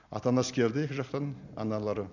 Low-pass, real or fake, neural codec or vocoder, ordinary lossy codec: 7.2 kHz; real; none; none